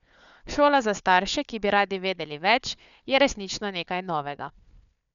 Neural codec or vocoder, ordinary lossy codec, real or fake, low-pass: codec, 16 kHz, 4 kbps, FunCodec, trained on Chinese and English, 50 frames a second; none; fake; 7.2 kHz